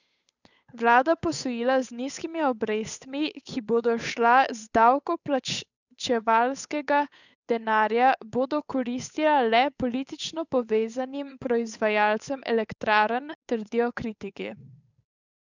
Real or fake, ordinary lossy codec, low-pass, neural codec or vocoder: fake; none; 7.2 kHz; codec, 16 kHz, 8 kbps, FunCodec, trained on Chinese and English, 25 frames a second